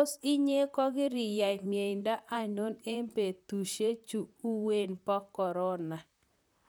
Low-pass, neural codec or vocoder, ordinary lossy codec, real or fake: none; vocoder, 44.1 kHz, 128 mel bands, Pupu-Vocoder; none; fake